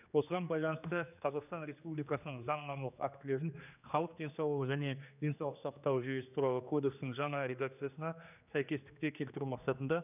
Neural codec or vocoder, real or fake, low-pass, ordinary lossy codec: codec, 16 kHz, 2 kbps, X-Codec, HuBERT features, trained on general audio; fake; 3.6 kHz; none